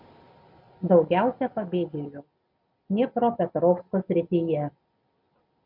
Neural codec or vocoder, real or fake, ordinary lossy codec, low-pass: none; real; MP3, 48 kbps; 5.4 kHz